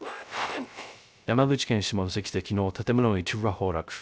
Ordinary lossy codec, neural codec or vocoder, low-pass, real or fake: none; codec, 16 kHz, 0.3 kbps, FocalCodec; none; fake